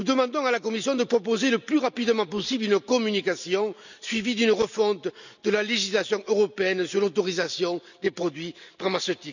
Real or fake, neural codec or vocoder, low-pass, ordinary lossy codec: real; none; 7.2 kHz; none